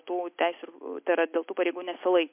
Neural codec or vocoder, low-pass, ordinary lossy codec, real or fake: none; 3.6 kHz; MP3, 32 kbps; real